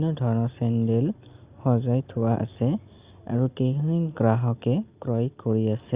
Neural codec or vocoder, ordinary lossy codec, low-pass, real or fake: none; Opus, 64 kbps; 3.6 kHz; real